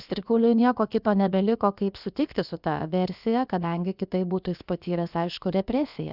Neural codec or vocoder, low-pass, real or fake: codec, 16 kHz, about 1 kbps, DyCAST, with the encoder's durations; 5.4 kHz; fake